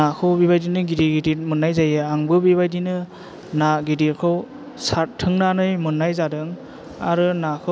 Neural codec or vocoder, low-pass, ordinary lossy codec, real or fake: none; none; none; real